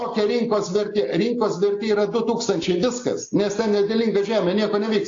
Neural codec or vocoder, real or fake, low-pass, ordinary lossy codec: none; real; 7.2 kHz; AAC, 48 kbps